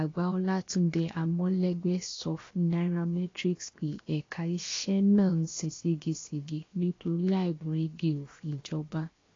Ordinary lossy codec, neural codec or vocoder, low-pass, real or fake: AAC, 32 kbps; codec, 16 kHz, 0.7 kbps, FocalCodec; 7.2 kHz; fake